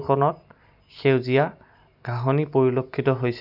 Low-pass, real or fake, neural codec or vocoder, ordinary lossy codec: 5.4 kHz; real; none; none